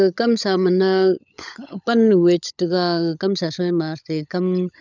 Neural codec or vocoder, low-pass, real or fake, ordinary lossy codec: codec, 16 kHz, 16 kbps, FunCodec, trained on LibriTTS, 50 frames a second; 7.2 kHz; fake; none